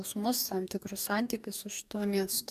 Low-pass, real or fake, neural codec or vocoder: 14.4 kHz; fake; codec, 44.1 kHz, 2.6 kbps, DAC